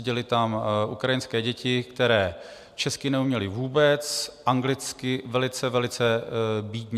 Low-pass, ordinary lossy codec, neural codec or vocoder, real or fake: 14.4 kHz; MP3, 96 kbps; none; real